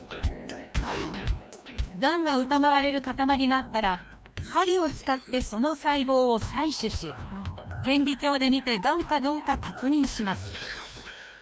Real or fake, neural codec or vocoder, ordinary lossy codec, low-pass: fake; codec, 16 kHz, 1 kbps, FreqCodec, larger model; none; none